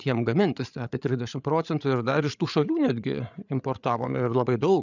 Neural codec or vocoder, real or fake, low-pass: codec, 16 kHz, 8 kbps, FreqCodec, larger model; fake; 7.2 kHz